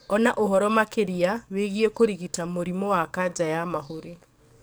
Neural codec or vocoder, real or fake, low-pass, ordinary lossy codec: codec, 44.1 kHz, 7.8 kbps, DAC; fake; none; none